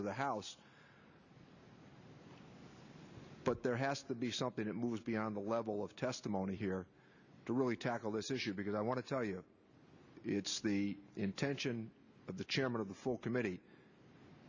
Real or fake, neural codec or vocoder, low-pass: real; none; 7.2 kHz